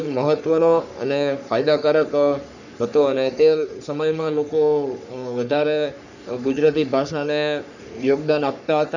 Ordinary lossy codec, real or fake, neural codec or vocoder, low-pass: none; fake; codec, 44.1 kHz, 3.4 kbps, Pupu-Codec; 7.2 kHz